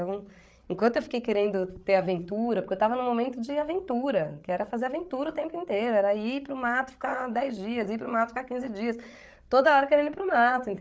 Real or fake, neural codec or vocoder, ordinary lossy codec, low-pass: fake; codec, 16 kHz, 8 kbps, FreqCodec, larger model; none; none